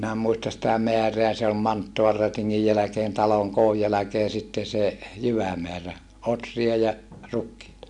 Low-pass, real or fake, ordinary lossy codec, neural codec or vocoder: 10.8 kHz; real; MP3, 64 kbps; none